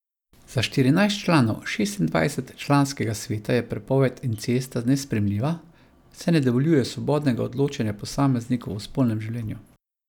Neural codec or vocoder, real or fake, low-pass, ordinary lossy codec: none; real; 19.8 kHz; none